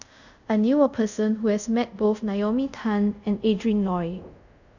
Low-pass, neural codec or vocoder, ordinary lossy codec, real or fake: 7.2 kHz; codec, 24 kHz, 0.5 kbps, DualCodec; none; fake